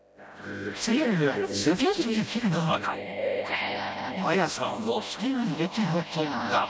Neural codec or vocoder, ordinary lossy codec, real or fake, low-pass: codec, 16 kHz, 0.5 kbps, FreqCodec, smaller model; none; fake; none